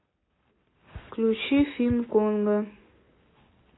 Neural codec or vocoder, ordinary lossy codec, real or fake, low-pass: none; AAC, 16 kbps; real; 7.2 kHz